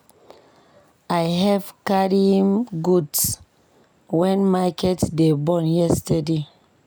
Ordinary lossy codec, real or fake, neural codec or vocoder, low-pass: none; real; none; none